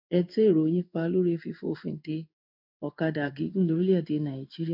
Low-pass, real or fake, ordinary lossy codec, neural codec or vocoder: 5.4 kHz; fake; none; codec, 16 kHz in and 24 kHz out, 1 kbps, XY-Tokenizer